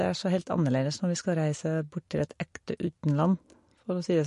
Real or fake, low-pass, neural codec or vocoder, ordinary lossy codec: real; 10.8 kHz; none; MP3, 48 kbps